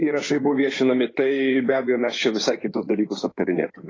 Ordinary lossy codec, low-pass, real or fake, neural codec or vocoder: AAC, 32 kbps; 7.2 kHz; fake; codec, 16 kHz in and 24 kHz out, 2.2 kbps, FireRedTTS-2 codec